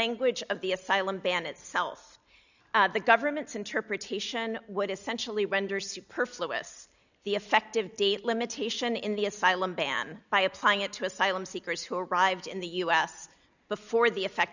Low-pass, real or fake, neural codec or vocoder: 7.2 kHz; real; none